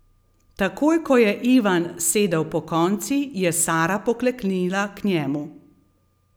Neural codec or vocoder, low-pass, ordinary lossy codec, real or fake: none; none; none; real